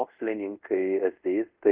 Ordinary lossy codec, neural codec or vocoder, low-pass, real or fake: Opus, 32 kbps; codec, 24 kHz, 0.5 kbps, DualCodec; 3.6 kHz; fake